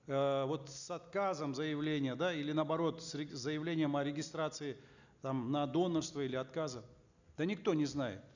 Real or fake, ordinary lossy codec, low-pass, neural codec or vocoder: real; none; 7.2 kHz; none